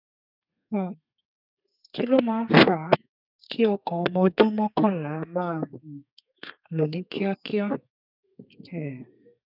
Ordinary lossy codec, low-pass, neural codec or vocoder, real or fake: none; 5.4 kHz; codec, 32 kHz, 1.9 kbps, SNAC; fake